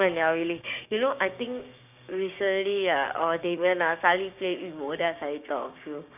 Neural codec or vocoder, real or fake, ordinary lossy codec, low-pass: codec, 16 kHz, 6 kbps, DAC; fake; none; 3.6 kHz